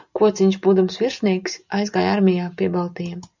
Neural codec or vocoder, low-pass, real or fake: none; 7.2 kHz; real